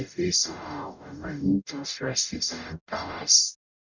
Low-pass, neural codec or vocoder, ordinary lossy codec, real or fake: 7.2 kHz; codec, 44.1 kHz, 0.9 kbps, DAC; none; fake